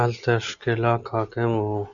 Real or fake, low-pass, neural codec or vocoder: real; 7.2 kHz; none